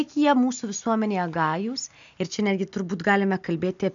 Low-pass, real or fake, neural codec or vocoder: 7.2 kHz; real; none